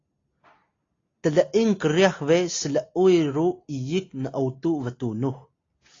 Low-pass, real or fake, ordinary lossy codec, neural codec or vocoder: 7.2 kHz; real; AAC, 32 kbps; none